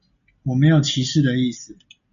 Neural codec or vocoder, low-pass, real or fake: none; 7.2 kHz; real